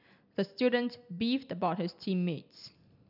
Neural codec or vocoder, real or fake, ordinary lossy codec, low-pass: none; real; none; 5.4 kHz